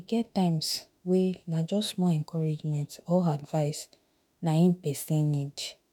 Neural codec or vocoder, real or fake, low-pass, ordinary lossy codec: autoencoder, 48 kHz, 32 numbers a frame, DAC-VAE, trained on Japanese speech; fake; none; none